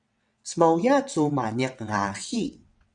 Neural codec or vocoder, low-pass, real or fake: vocoder, 22.05 kHz, 80 mel bands, WaveNeXt; 9.9 kHz; fake